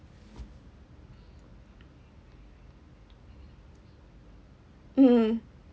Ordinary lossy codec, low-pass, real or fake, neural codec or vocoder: none; none; real; none